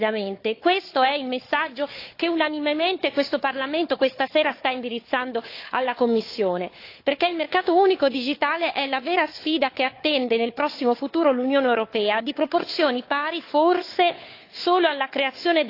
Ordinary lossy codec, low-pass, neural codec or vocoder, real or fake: AAC, 32 kbps; 5.4 kHz; codec, 16 kHz, 4 kbps, FunCodec, trained on Chinese and English, 50 frames a second; fake